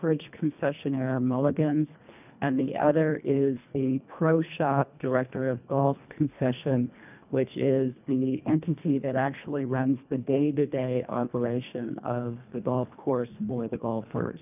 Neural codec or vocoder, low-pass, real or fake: codec, 24 kHz, 1.5 kbps, HILCodec; 3.6 kHz; fake